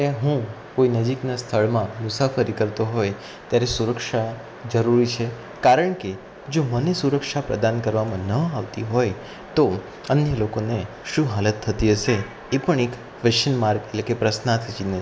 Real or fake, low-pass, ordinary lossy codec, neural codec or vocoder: real; none; none; none